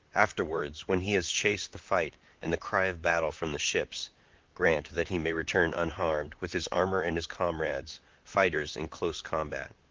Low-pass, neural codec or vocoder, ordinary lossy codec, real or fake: 7.2 kHz; vocoder, 44.1 kHz, 128 mel bands, Pupu-Vocoder; Opus, 32 kbps; fake